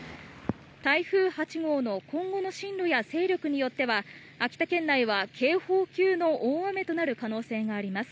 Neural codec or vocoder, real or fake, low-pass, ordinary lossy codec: none; real; none; none